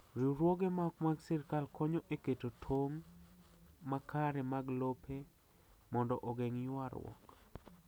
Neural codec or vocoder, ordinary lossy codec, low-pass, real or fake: none; none; none; real